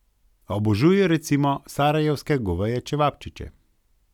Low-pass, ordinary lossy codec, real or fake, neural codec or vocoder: 19.8 kHz; none; real; none